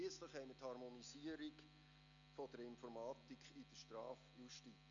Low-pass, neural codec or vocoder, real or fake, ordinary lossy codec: 7.2 kHz; none; real; none